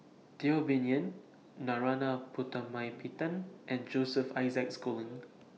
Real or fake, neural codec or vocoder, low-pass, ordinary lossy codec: real; none; none; none